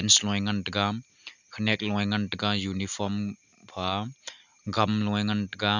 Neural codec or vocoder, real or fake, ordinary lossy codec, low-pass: none; real; none; 7.2 kHz